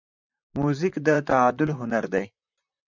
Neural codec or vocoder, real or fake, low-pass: vocoder, 44.1 kHz, 128 mel bands, Pupu-Vocoder; fake; 7.2 kHz